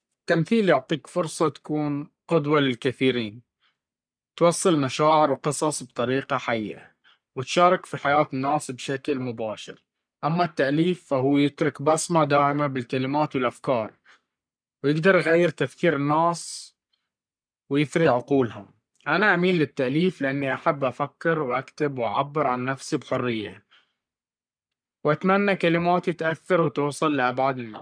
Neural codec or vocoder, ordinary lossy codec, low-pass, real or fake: codec, 44.1 kHz, 3.4 kbps, Pupu-Codec; none; 9.9 kHz; fake